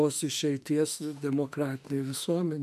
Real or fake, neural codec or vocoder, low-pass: fake; autoencoder, 48 kHz, 32 numbers a frame, DAC-VAE, trained on Japanese speech; 14.4 kHz